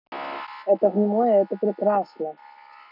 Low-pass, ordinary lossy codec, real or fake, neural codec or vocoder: 5.4 kHz; none; real; none